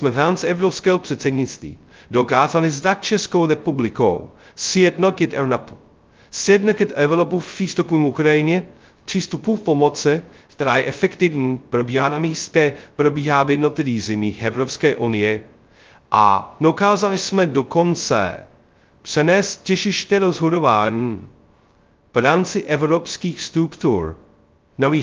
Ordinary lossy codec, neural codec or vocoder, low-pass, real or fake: Opus, 24 kbps; codec, 16 kHz, 0.2 kbps, FocalCodec; 7.2 kHz; fake